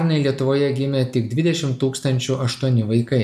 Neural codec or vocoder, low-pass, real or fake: none; 14.4 kHz; real